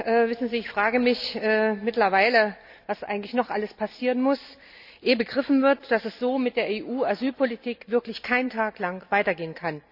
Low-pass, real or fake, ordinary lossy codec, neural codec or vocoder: 5.4 kHz; real; none; none